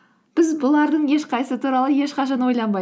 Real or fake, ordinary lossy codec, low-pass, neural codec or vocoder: real; none; none; none